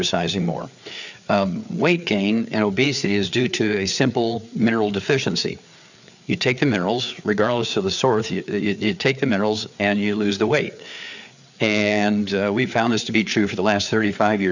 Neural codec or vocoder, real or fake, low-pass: codec, 16 kHz, 4 kbps, FreqCodec, larger model; fake; 7.2 kHz